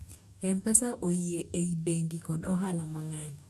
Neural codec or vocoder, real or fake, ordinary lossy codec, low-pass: codec, 44.1 kHz, 2.6 kbps, DAC; fake; none; 14.4 kHz